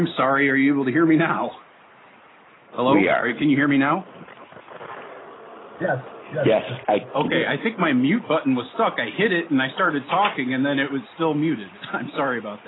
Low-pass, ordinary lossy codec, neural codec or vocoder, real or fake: 7.2 kHz; AAC, 16 kbps; none; real